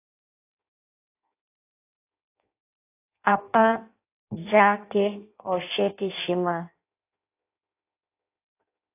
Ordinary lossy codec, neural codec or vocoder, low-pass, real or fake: AAC, 24 kbps; codec, 16 kHz in and 24 kHz out, 0.6 kbps, FireRedTTS-2 codec; 3.6 kHz; fake